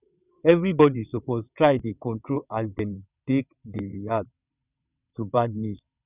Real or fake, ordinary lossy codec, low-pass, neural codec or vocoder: fake; none; 3.6 kHz; vocoder, 22.05 kHz, 80 mel bands, WaveNeXt